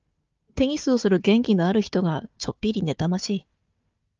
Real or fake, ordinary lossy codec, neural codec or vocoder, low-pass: fake; Opus, 24 kbps; codec, 16 kHz, 4 kbps, FunCodec, trained on Chinese and English, 50 frames a second; 7.2 kHz